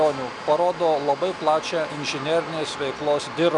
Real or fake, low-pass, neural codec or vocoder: real; 10.8 kHz; none